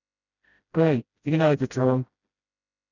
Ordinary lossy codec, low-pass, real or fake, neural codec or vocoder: none; 7.2 kHz; fake; codec, 16 kHz, 0.5 kbps, FreqCodec, smaller model